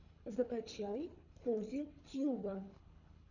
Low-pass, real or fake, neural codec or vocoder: 7.2 kHz; fake; codec, 24 kHz, 3 kbps, HILCodec